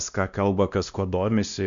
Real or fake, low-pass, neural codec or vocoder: fake; 7.2 kHz; codec, 16 kHz, 1 kbps, X-Codec, WavLM features, trained on Multilingual LibriSpeech